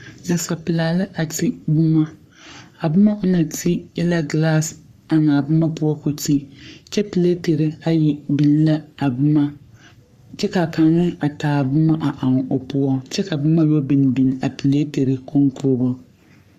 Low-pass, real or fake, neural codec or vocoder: 14.4 kHz; fake; codec, 44.1 kHz, 3.4 kbps, Pupu-Codec